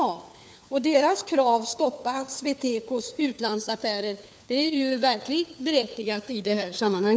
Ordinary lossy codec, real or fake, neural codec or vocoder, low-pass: none; fake; codec, 16 kHz, 4 kbps, FunCodec, trained on LibriTTS, 50 frames a second; none